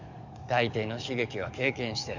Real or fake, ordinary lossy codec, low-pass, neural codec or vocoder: fake; none; 7.2 kHz; codec, 44.1 kHz, 7.8 kbps, DAC